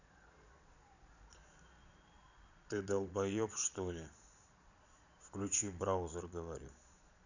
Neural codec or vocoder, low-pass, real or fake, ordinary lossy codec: vocoder, 22.05 kHz, 80 mel bands, Vocos; 7.2 kHz; fake; none